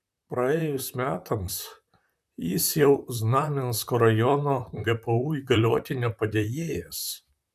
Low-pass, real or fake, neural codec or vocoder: 14.4 kHz; fake; vocoder, 44.1 kHz, 128 mel bands, Pupu-Vocoder